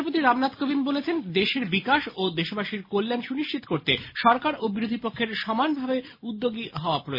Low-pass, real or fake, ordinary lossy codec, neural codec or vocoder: 5.4 kHz; real; MP3, 24 kbps; none